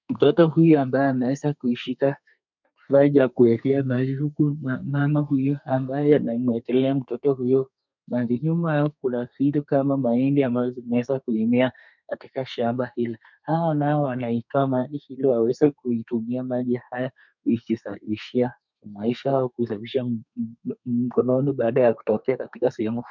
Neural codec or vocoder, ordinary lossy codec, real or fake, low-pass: codec, 32 kHz, 1.9 kbps, SNAC; MP3, 64 kbps; fake; 7.2 kHz